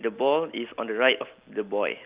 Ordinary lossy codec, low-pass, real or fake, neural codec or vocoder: Opus, 32 kbps; 3.6 kHz; real; none